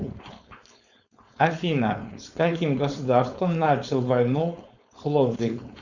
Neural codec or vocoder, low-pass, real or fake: codec, 16 kHz, 4.8 kbps, FACodec; 7.2 kHz; fake